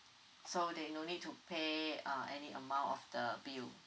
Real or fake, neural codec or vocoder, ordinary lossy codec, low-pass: real; none; none; none